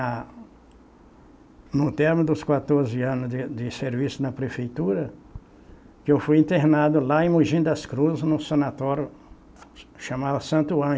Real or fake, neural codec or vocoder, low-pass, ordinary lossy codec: real; none; none; none